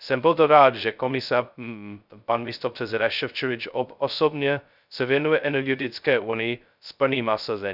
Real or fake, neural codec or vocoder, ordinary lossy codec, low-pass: fake; codec, 16 kHz, 0.2 kbps, FocalCodec; none; 5.4 kHz